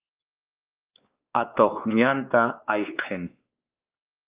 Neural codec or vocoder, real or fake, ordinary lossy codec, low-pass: codec, 16 kHz, 2 kbps, X-Codec, WavLM features, trained on Multilingual LibriSpeech; fake; Opus, 32 kbps; 3.6 kHz